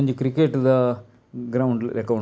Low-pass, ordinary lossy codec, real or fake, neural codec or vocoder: none; none; real; none